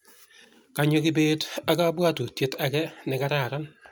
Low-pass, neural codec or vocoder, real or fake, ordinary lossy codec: none; none; real; none